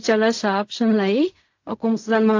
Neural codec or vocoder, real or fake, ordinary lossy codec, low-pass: codec, 16 kHz in and 24 kHz out, 0.4 kbps, LongCat-Audio-Codec, fine tuned four codebook decoder; fake; AAC, 48 kbps; 7.2 kHz